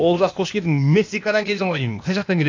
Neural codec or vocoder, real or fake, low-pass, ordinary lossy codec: codec, 16 kHz, 0.8 kbps, ZipCodec; fake; 7.2 kHz; MP3, 64 kbps